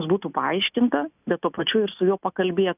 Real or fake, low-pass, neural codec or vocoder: real; 3.6 kHz; none